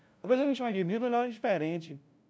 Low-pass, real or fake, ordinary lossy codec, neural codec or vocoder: none; fake; none; codec, 16 kHz, 0.5 kbps, FunCodec, trained on LibriTTS, 25 frames a second